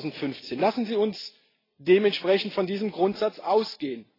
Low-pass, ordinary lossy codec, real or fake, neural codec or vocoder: 5.4 kHz; AAC, 24 kbps; real; none